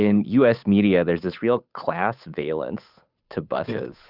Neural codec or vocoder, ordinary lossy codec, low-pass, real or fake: codec, 16 kHz, 8 kbps, FunCodec, trained on Chinese and English, 25 frames a second; Opus, 64 kbps; 5.4 kHz; fake